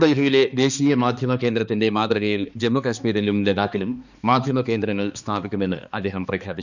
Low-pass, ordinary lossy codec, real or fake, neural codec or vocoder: 7.2 kHz; none; fake; codec, 16 kHz, 2 kbps, X-Codec, HuBERT features, trained on balanced general audio